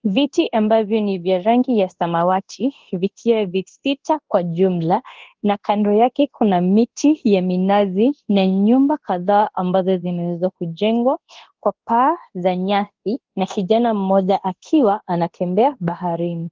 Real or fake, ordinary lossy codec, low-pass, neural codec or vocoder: fake; Opus, 16 kbps; 7.2 kHz; codec, 24 kHz, 0.9 kbps, DualCodec